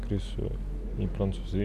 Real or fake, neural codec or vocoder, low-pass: real; none; 14.4 kHz